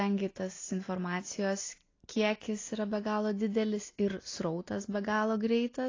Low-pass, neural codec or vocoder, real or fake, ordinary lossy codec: 7.2 kHz; none; real; AAC, 32 kbps